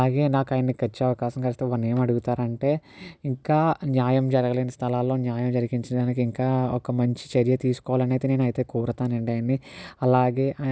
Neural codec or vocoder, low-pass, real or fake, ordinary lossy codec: none; none; real; none